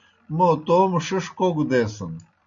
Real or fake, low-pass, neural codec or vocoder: real; 7.2 kHz; none